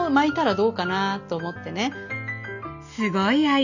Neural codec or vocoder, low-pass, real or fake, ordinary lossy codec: none; 7.2 kHz; real; none